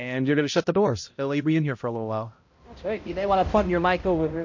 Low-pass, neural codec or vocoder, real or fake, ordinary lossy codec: 7.2 kHz; codec, 16 kHz, 0.5 kbps, X-Codec, HuBERT features, trained on balanced general audio; fake; MP3, 48 kbps